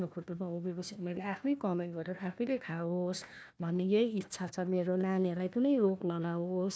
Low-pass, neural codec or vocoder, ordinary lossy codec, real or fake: none; codec, 16 kHz, 1 kbps, FunCodec, trained on Chinese and English, 50 frames a second; none; fake